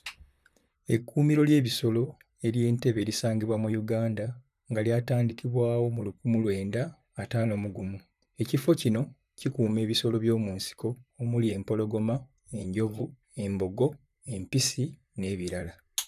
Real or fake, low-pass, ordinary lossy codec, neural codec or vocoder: fake; 14.4 kHz; none; vocoder, 44.1 kHz, 128 mel bands, Pupu-Vocoder